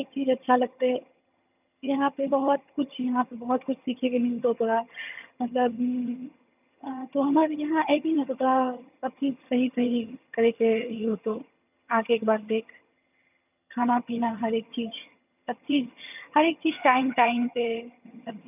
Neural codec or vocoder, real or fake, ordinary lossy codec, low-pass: vocoder, 22.05 kHz, 80 mel bands, HiFi-GAN; fake; none; 3.6 kHz